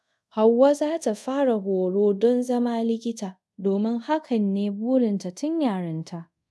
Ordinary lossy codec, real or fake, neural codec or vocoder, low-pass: none; fake; codec, 24 kHz, 0.5 kbps, DualCodec; none